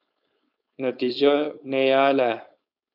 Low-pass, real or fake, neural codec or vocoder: 5.4 kHz; fake; codec, 16 kHz, 4.8 kbps, FACodec